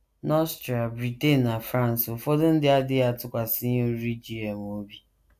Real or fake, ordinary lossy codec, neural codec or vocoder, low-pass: real; AAC, 96 kbps; none; 14.4 kHz